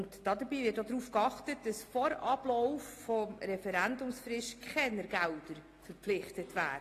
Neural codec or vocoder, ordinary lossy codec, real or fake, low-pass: none; AAC, 48 kbps; real; 14.4 kHz